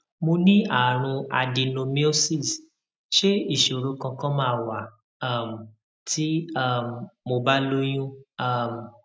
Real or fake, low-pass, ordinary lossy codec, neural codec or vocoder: real; none; none; none